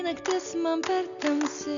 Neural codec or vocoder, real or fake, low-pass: none; real; 7.2 kHz